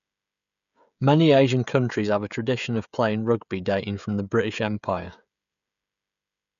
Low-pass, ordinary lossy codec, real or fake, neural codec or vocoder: 7.2 kHz; none; fake; codec, 16 kHz, 16 kbps, FreqCodec, smaller model